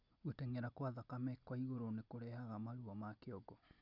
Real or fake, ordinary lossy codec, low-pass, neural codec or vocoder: real; none; 5.4 kHz; none